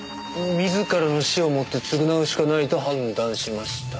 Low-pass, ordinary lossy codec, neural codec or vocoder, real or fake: none; none; none; real